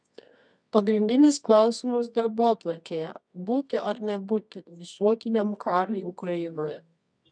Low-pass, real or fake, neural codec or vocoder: 9.9 kHz; fake; codec, 24 kHz, 0.9 kbps, WavTokenizer, medium music audio release